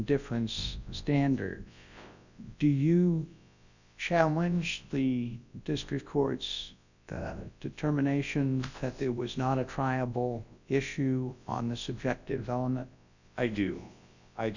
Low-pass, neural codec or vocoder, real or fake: 7.2 kHz; codec, 24 kHz, 0.9 kbps, WavTokenizer, large speech release; fake